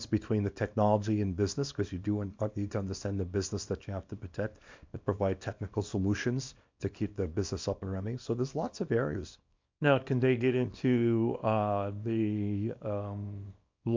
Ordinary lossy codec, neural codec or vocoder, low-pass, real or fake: MP3, 64 kbps; codec, 24 kHz, 0.9 kbps, WavTokenizer, small release; 7.2 kHz; fake